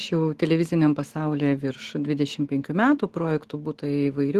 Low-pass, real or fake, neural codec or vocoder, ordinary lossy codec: 14.4 kHz; fake; autoencoder, 48 kHz, 128 numbers a frame, DAC-VAE, trained on Japanese speech; Opus, 16 kbps